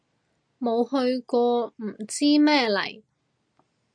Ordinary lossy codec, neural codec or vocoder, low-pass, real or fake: MP3, 96 kbps; none; 9.9 kHz; real